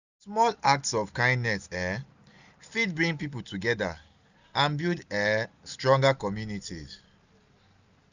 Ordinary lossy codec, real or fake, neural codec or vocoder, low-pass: none; real; none; 7.2 kHz